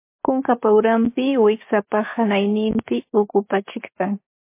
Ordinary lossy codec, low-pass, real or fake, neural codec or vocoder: MP3, 24 kbps; 3.6 kHz; fake; codec, 16 kHz, 6 kbps, DAC